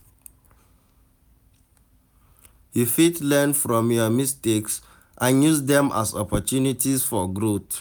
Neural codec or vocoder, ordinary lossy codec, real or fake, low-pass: none; none; real; none